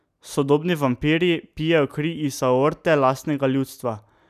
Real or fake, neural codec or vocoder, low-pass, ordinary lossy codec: real; none; 14.4 kHz; none